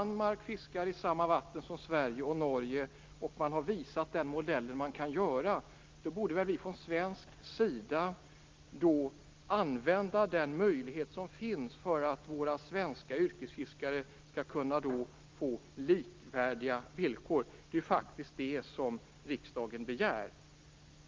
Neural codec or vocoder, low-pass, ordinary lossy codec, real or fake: none; 7.2 kHz; Opus, 32 kbps; real